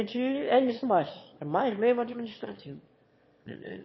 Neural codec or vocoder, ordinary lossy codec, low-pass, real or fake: autoencoder, 22.05 kHz, a latent of 192 numbers a frame, VITS, trained on one speaker; MP3, 24 kbps; 7.2 kHz; fake